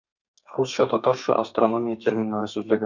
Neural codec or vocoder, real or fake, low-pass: codec, 32 kHz, 1.9 kbps, SNAC; fake; 7.2 kHz